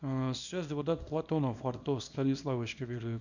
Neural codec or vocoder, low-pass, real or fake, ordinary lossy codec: codec, 24 kHz, 0.9 kbps, WavTokenizer, small release; 7.2 kHz; fake; none